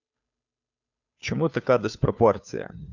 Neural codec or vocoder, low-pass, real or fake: codec, 16 kHz, 2 kbps, FunCodec, trained on Chinese and English, 25 frames a second; 7.2 kHz; fake